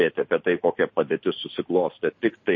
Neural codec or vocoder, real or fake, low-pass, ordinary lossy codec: none; real; 7.2 kHz; MP3, 32 kbps